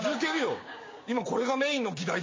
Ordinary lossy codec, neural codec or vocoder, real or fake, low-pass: MP3, 32 kbps; codec, 16 kHz, 6 kbps, DAC; fake; 7.2 kHz